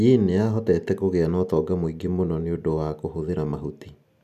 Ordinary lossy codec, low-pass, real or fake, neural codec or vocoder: none; 14.4 kHz; real; none